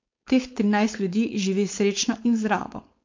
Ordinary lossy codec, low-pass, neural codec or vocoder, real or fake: MP3, 48 kbps; 7.2 kHz; codec, 16 kHz, 4.8 kbps, FACodec; fake